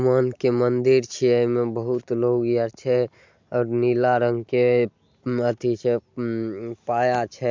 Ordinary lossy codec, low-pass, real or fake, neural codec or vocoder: MP3, 64 kbps; 7.2 kHz; real; none